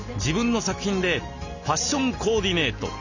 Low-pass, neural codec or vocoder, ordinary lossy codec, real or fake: 7.2 kHz; none; none; real